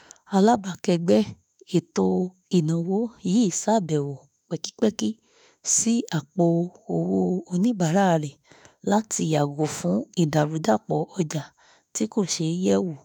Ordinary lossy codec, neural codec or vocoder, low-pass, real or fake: none; autoencoder, 48 kHz, 32 numbers a frame, DAC-VAE, trained on Japanese speech; none; fake